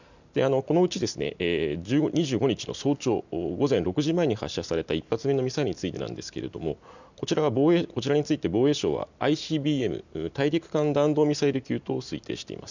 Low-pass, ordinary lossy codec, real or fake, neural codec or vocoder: 7.2 kHz; none; real; none